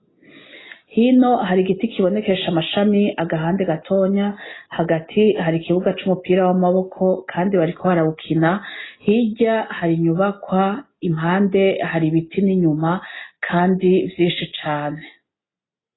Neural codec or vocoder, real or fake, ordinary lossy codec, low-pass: none; real; AAC, 16 kbps; 7.2 kHz